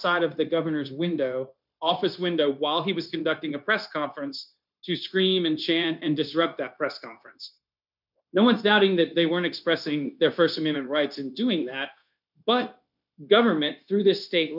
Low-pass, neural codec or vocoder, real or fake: 5.4 kHz; codec, 16 kHz, 0.9 kbps, LongCat-Audio-Codec; fake